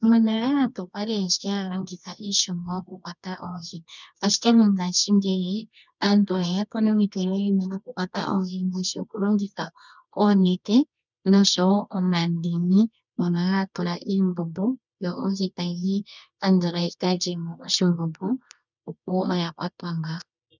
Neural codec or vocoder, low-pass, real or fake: codec, 24 kHz, 0.9 kbps, WavTokenizer, medium music audio release; 7.2 kHz; fake